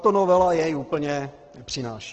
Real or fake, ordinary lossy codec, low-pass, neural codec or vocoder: real; Opus, 16 kbps; 7.2 kHz; none